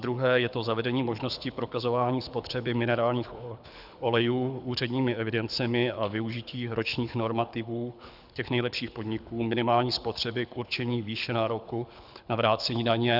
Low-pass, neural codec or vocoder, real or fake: 5.4 kHz; codec, 24 kHz, 6 kbps, HILCodec; fake